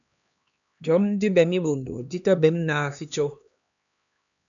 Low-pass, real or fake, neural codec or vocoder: 7.2 kHz; fake; codec, 16 kHz, 2 kbps, X-Codec, HuBERT features, trained on LibriSpeech